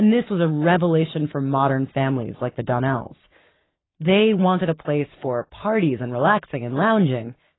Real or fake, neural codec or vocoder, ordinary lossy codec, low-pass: fake; codec, 44.1 kHz, 7.8 kbps, Pupu-Codec; AAC, 16 kbps; 7.2 kHz